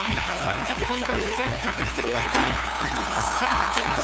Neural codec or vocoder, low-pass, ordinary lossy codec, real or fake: codec, 16 kHz, 2 kbps, FunCodec, trained on LibriTTS, 25 frames a second; none; none; fake